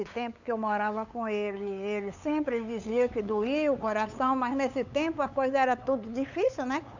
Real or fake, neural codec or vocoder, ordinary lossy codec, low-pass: fake; codec, 16 kHz, 8 kbps, FunCodec, trained on LibriTTS, 25 frames a second; none; 7.2 kHz